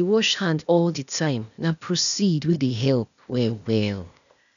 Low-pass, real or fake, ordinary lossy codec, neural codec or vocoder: 7.2 kHz; fake; none; codec, 16 kHz, 0.8 kbps, ZipCodec